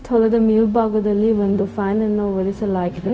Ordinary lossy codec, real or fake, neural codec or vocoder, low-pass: none; fake; codec, 16 kHz, 0.4 kbps, LongCat-Audio-Codec; none